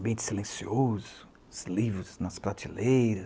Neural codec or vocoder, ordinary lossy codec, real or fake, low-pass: none; none; real; none